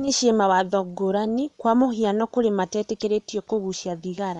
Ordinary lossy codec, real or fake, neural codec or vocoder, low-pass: none; fake; vocoder, 24 kHz, 100 mel bands, Vocos; 10.8 kHz